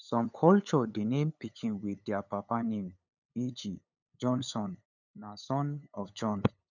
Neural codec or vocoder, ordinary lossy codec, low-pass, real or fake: codec, 16 kHz, 8 kbps, FunCodec, trained on LibriTTS, 25 frames a second; none; 7.2 kHz; fake